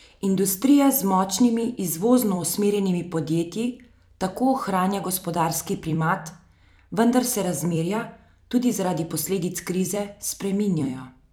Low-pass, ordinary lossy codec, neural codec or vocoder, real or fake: none; none; vocoder, 44.1 kHz, 128 mel bands every 256 samples, BigVGAN v2; fake